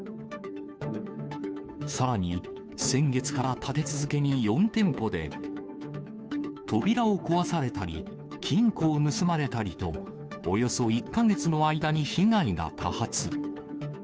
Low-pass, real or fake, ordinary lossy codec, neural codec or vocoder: none; fake; none; codec, 16 kHz, 2 kbps, FunCodec, trained on Chinese and English, 25 frames a second